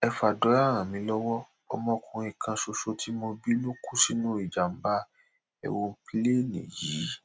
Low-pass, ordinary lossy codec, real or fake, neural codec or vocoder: none; none; real; none